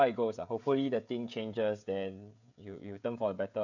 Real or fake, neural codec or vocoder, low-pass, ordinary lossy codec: fake; codec, 16 kHz, 16 kbps, FreqCodec, smaller model; 7.2 kHz; none